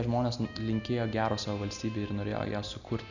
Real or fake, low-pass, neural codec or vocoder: real; 7.2 kHz; none